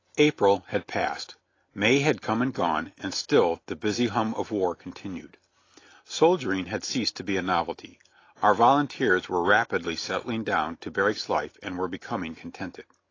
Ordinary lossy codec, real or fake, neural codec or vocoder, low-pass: AAC, 32 kbps; real; none; 7.2 kHz